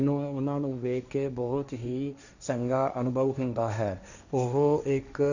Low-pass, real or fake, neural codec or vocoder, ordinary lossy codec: 7.2 kHz; fake; codec, 16 kHz, 1.1 kbps, Voila-Tokenizer; none